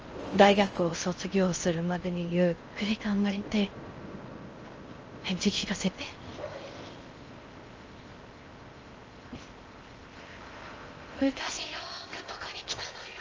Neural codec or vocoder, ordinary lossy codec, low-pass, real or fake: codec, 16 kHz in and 24 kHz out, 0.6 kbps, FocalCodec, streaming, 4096 codes; Opus, 24 kbps; 7.2 kHz; fake